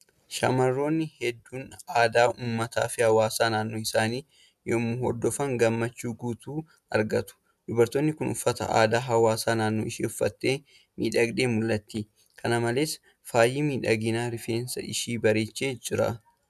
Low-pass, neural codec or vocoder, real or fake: 14.4 kHz; none; real